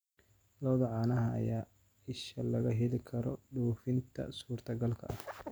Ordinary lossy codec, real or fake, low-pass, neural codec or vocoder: none; real; none; none